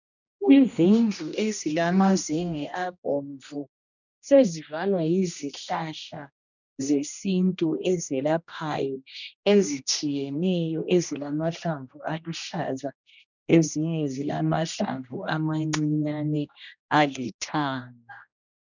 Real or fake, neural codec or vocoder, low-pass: fake; codec, 16 kHz, 1 kbps, X-Codec, HuBERT features, trained on general audio; 7.2 kHz